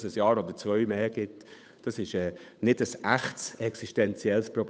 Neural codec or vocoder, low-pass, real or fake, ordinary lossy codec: codec, 16 kHz, 8 kbps, FunCodec, trained on Chinese and English, 25 frames a second; none; fake; none